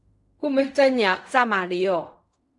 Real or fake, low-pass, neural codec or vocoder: fake; 10.8 kHz; codec, 16 kHz in and 24 kHz out, 0.4 kbps, LongCat-Audio-Codec, fine tuned four codebook decoder